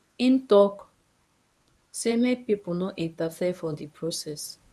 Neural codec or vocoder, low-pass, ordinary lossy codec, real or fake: codec, 24 kHz, 0.9 kbps, WavTokenizer, medium speech release version 1; none; none; fake